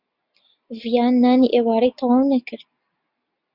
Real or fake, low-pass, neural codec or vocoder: real; 5.4 kHz; none